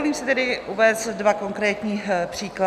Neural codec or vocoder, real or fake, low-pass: none; real; 14.4 kHz